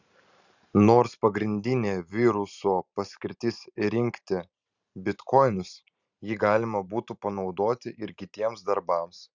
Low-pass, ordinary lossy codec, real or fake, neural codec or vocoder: 7.2 kHz; Opus, 64 kbps; fake; vocoder, 44.1 kHz, 128 mel bands every 512 samples, BigVGAN v2